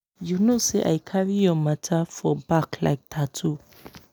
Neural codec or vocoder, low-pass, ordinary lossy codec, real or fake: none; none; none; real